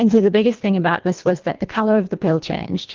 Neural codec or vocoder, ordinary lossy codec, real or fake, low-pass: codec, 24 kHz, 1.5 kbps, HILCodec; Opus, 24 kbps; fake; 7.2 kHz